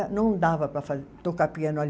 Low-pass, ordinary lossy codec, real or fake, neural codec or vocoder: none; none; real; none